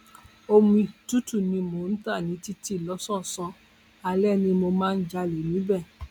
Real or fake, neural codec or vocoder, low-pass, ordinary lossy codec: real; none; 19.8 kHz; none